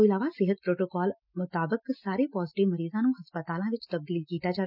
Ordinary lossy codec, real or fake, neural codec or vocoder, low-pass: none; real; none; 5.4 kHz